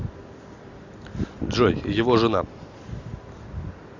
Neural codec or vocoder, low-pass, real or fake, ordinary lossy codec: none; 7.2 kHz; real; AAC, 48 kbps